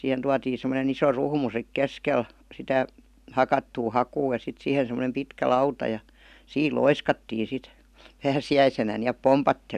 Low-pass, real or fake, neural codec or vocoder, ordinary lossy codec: 14.4 kHz; real; none; none